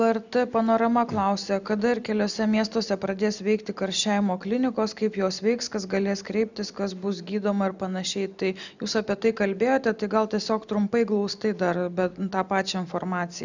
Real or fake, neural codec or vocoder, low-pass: real; none; 7.2 kHz